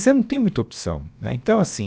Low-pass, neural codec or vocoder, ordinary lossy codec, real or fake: none; codec, 16 kHz, about 1 kbps, DyCAST, with the encoder's durations; none; fake